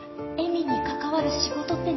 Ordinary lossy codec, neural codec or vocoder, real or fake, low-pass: MP3, 24 kbps; none; real; 7.2 kHz